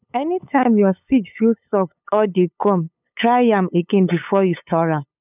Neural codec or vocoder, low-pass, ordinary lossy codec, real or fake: codec, 16 kHz, 8 kbps, FunCodec, trained on LibriTTS, 25 frames a second; 3.6 kHz; none; fake